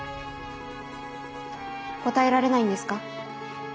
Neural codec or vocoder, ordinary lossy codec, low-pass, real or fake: none; none; none; real